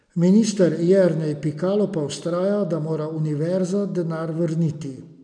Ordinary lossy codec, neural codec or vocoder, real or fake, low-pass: none; none; real; 9.9 kHz